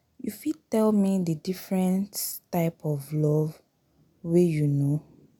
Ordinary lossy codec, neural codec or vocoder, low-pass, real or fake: none; none; none; real